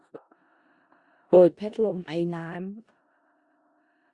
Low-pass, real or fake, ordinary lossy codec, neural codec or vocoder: 10.8 kHz; fake; Opus, 64 kbps; codec, 16 kHz in and 24 kHz out, 0.4 kbps, LongCat-Audio-Codec, four codebook decoder